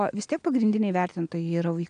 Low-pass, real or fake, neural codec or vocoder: 9.9 kHz; real; none